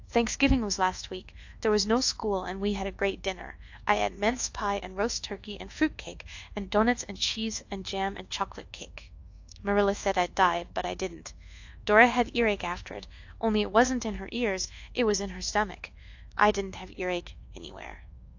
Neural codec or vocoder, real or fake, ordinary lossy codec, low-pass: codec, 24 kHz, 1.2 kbps, DualCodec; fake; AAC, 48 kbps; 7.2 kHz